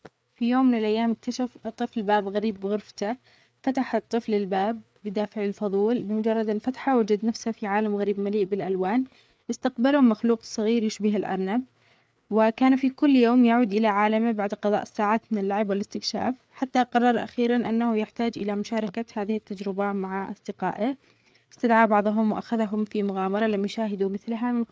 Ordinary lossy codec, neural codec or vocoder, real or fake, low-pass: none; codec, 16 kHz, 4 kbps, FreqCodec, larger model; fake; none